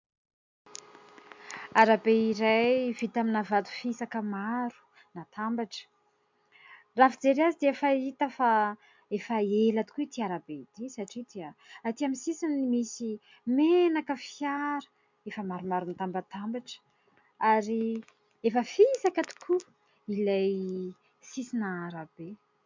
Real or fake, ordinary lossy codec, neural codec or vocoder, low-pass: real; MP3, 64 kbps; none; 7.2 kHz